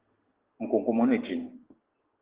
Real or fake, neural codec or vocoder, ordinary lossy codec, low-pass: real; none; Opus, 16 kbps; 3.6 kHz